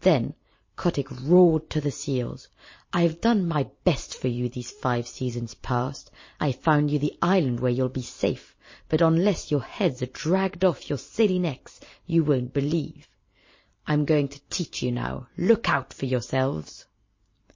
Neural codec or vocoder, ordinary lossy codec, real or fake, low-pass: vocoder, 44.1 kHz, 128 mel bands every 512 samples, BigVGAN v2; MP3, 32 kbps; fake; 7.2 kHz